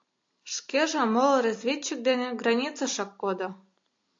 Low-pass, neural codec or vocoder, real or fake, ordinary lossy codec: 7.2 kHz; none; real; AAC, 48 kbps